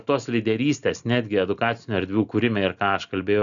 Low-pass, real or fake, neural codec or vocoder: 7.2 kHz; real; none